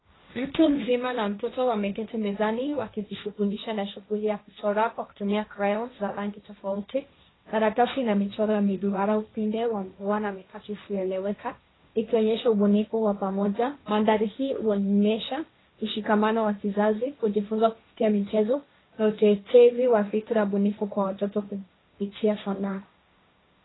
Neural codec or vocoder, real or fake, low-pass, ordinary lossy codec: codec, 16 kHz, 1.1 kbps, Voila-Tokenizer; fake; 7.2 kHz; AAC, 16 kbps